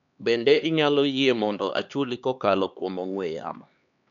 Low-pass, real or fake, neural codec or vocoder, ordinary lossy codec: 7.2 kHz; fake; codec, 16 kHz, 2 kbps, X-Codec, HuBERT features, trained on LibriSpeech; none